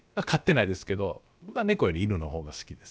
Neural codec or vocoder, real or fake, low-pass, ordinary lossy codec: codec, 16 kHz, about 1 kbps, DyCAST, with the encoder's durations; fake; none; none